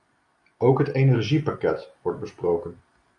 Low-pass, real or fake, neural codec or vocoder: 10.8 kHz; real; none